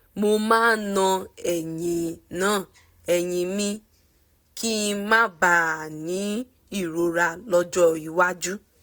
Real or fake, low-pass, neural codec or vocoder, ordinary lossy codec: real; none; none; none